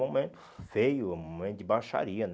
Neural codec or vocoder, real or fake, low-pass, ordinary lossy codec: none; real; none; none